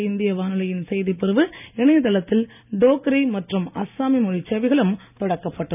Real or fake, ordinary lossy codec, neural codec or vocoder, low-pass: real; none; none; 3.6 kHz